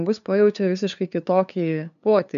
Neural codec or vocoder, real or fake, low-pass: codec, 16 kHz, 2 kbps, FunCodec, trained on LibriTTS, 25 frames a second; fake; 7.2 kHz